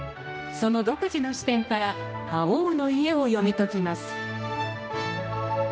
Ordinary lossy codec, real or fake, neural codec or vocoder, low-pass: none; fake; codec, 16 kHz, 1 kbps, X-Codec, HuBERT features, trained on general audio; none